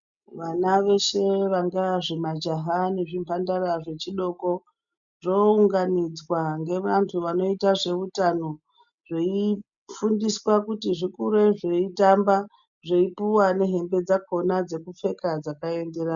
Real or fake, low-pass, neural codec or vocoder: real; 7.2 kHz; none